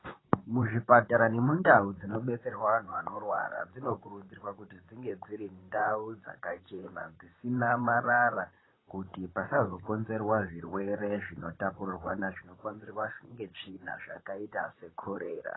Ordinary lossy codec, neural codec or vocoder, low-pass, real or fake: AAC, 16 kbps; vocoder, 44.1 kHz, 128 mel bands, Pupu-Vocoder; 7.2 kHz; fake